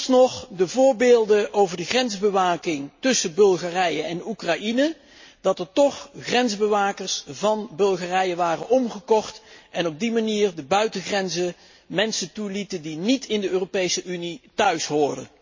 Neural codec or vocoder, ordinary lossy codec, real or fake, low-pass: none; MP3, 32 kbps; real; 7.2 kHz